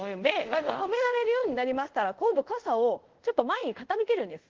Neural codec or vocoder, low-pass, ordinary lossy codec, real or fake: codec, 24 kHz, 0.5 kbps, DualCodec; 7.2 kHz; Opus, 16 kbps; fake